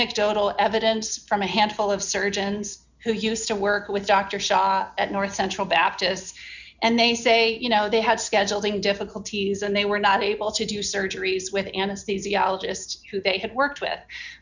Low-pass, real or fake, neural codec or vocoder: 7.2 kHz; real; none